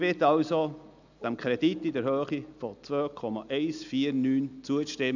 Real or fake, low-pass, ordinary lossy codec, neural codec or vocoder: real; 7.2 kHz; none; none